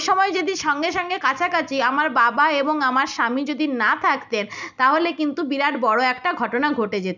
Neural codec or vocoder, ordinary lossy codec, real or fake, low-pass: none; none; real; 7.2 kHz